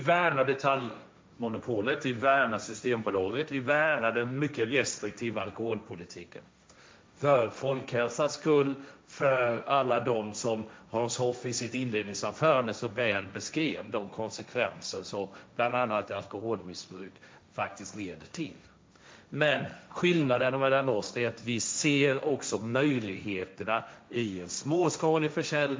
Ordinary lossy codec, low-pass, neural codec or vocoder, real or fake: none; none; codec, 16 kHz, 1.1 kbps, Voila-Tokenizer; fake